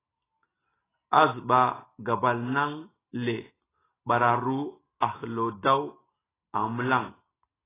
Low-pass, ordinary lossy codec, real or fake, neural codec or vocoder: 3.6 kHz; AAC, 16 kbps; real; none